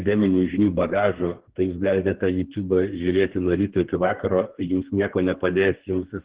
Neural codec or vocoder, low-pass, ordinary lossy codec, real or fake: codec, 32 kHz, 1.9 kbps, SNAC; 3.6 kHz; Opus, 16 kbps; fake